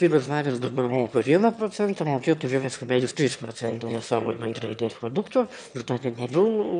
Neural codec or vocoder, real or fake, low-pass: autoencoder, 22.05 kHz, a latent of 192 numbers a frame, VITS, trained on one speaker; fake; 9.9 kHz